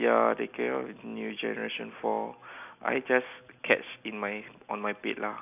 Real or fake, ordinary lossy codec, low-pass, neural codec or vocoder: real; none; 3.6 kHz; none